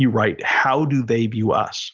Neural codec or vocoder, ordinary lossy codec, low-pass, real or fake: none; Opus, 32 kbps; 7.2 kHz; real